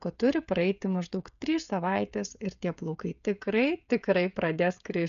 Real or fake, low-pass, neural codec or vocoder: fake; 7.2 kHz; codec, 16 kHz, 16 kbps, FreqCodec, smaller model